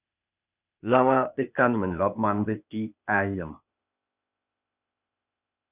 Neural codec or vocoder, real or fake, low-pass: codec, 16 kHz, 0.8 kbps, ZipCodec; fake; 3.6 kHz